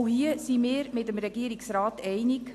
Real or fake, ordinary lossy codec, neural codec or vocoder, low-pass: real; AAC, 64 kbps; none; 14.4 kHz